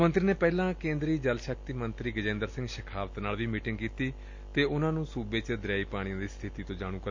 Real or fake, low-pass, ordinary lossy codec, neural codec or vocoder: real; 7.2 kHz; MP3, 48 kbps; none